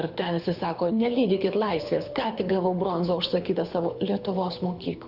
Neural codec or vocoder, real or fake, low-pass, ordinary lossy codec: codec, 24 kHz, 6 kbps, HILCodec; fake; 5.4 kHz; Opus, 64 kbps